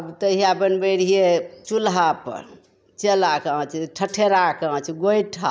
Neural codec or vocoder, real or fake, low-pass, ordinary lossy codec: none; real; none; none